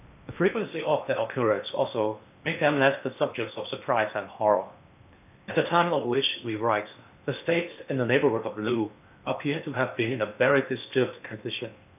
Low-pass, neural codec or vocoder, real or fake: 3.6 kHz; codec, 16 kHz in and 24 kHz out, 0.8 kbps, FocalCodec, streaming, 65536 codes; fake